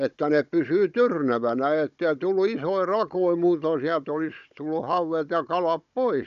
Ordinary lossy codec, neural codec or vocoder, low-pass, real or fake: AAC, 96 kbps; codec, 16 kHz, 16 kbps, FunCodec, trained on Chinese and English, 50 frames a second; 7.2 kHz; fake